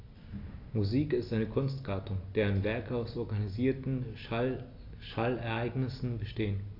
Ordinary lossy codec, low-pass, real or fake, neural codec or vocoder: AAC, 32 kbps; 5.4 kHz; real; none